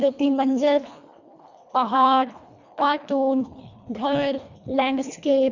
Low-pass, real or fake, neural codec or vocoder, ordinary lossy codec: 7.2 kHz; fake; codec, 24 kHz, 1.5 kbps, HILCodec; none